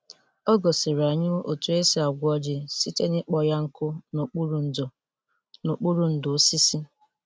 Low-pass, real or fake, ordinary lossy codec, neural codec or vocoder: none; real; none; none